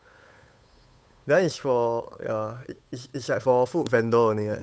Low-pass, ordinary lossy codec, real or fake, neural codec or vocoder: none; none; fake; codec, 16 kHz, 8 kbps, FunCodec, trained on Chinese and English, 25 frames a second